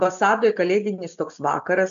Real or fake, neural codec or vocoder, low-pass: real; none; 7.2 kHz